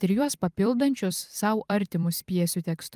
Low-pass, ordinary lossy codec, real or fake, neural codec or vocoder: 14.4 kHz; Opus, 32 kbps; fake; vocoder, 44.1 kHz, 128 mel bands every 256 samples, BigVGAN v2